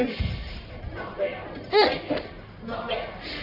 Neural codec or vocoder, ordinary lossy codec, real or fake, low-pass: codec, 44.1 kHz, 1.7 kbps, Pupu-Codec; none; fake; 5.4 kHz